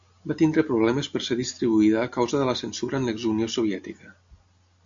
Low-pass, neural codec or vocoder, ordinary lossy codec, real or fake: 7.2 kHz; none; MP3, 64 kbps; real